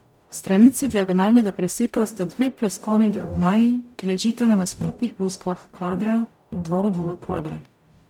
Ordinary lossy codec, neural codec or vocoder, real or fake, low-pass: none; codec, 44.1 kHz, 0.9 kbps, DAC; fake; 19.8 kHz